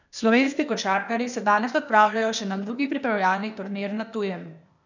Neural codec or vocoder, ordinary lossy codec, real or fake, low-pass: codec, 16 kHz, 0.8 kbps, ZipCodec; none; fake; 7.2 kHz